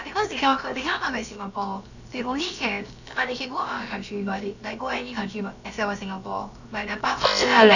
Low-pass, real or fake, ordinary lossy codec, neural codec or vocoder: 7.2 kHz; fake; none; codec, 16 kHz, about 1 kbps, DyCAST, with the encoder's durations